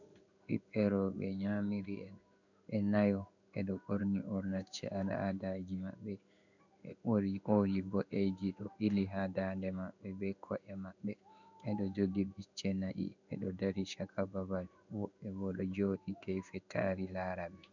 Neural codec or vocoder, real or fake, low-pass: codec, 16 kHz in and 24 kHz out, 1 kbps, XY-Tokenizer; fake; 7.2 kHz